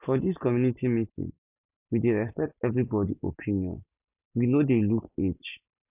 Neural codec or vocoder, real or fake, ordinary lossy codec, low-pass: none; real; none; 3.6 kHz